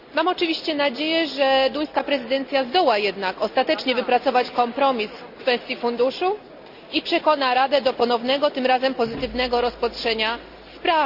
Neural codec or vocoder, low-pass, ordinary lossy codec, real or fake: none; 5.4 kHz; Opus, 64 kbps; real